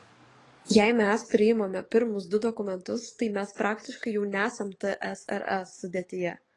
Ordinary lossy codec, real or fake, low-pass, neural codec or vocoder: AAC, 32 kbps; fake; 10.8 kHz; codec, 44.1 kHz, 7.8 kbps, DAC